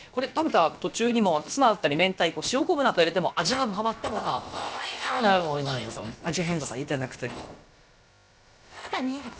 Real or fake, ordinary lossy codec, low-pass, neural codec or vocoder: fake; none; none; codec, 16 kHz, about 1 kbps, DyCAST, with the encoder's durations